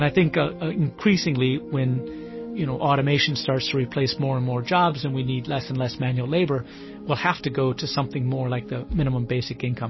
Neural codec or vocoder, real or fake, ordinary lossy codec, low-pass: none; real; MP3, 24 kbps; 7.2 kHz